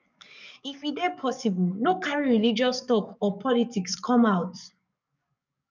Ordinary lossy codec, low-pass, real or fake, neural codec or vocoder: none; 7.2 kHz; fake; codec, 44.1 kHz, 7.8 kbps, DAC